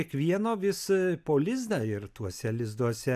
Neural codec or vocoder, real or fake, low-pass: none; real; 14.4 kHz